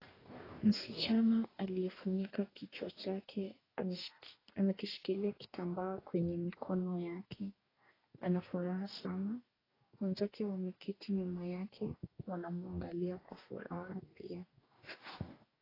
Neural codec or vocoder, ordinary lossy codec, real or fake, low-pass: codec, 44.1 kHz, 2.6 kbps, DAC; AAC, 24 kbps; fake; 5.4 kHz